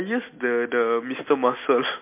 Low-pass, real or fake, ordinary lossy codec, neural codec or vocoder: 3.6 kHz; real; MP3, 24 kbps; none